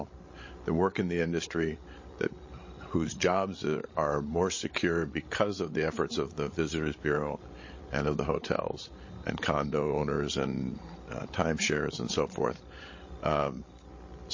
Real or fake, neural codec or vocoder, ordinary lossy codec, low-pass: fake; codec, 16 kHz, 16 kbps, FreqCodec, larger model; MP3, 32 kbps; 7.2 kHz